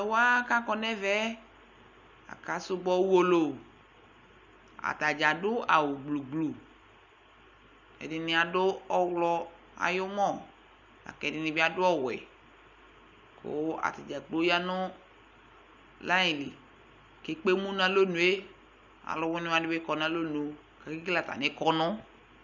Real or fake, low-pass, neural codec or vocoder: real; 7.2 kHz; none